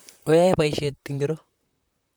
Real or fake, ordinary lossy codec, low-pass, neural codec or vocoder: fake; none; none; vocoder, 44.1 kHz, 128 mel bands, Pupu-Vocoder